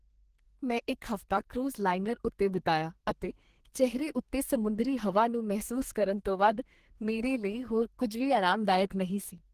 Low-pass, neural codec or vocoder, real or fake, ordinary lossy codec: 14.4 kHz; codec, 32 kHz, 1.9 kbps, SNAC; fake; Opus, 16 kbps